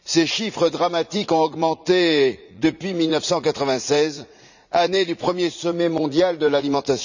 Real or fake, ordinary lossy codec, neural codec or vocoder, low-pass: fake; none; vocoder, 44.1 kHz, 80 mel bands, Vocos; 7.2 kHz